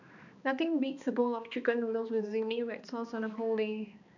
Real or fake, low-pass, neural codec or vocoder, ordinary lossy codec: fake; 7.2 kHz; codec, 16 kHz, 2 kbps, X-Codec, HuBERT features, trained on balanced general audio; none